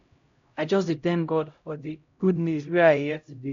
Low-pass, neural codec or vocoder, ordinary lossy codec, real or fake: 7.2 kHz; codec, 16 kHz, 0.5 kbps, X-Codec, HuBERT features, trained on LibriSpeech; MP3, 48 kbps; fake